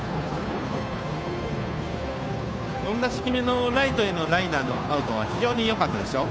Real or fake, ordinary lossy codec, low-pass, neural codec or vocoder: fake; none; none; codec, 16 kHz, 2 kbps, FunCodec, trained on Chinese and English, 25 frames a second